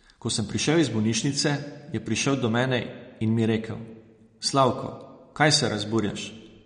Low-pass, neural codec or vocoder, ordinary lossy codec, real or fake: 9.9 kHz; none; MP3, 48 kbps; real